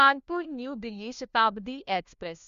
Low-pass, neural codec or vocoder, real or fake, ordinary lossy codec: 7.2 kHz; codec, 16 kHz, 1 kbps, FunCodec, trained on LibriTTS, 50 frames a second; fake; Opus, 64 kbps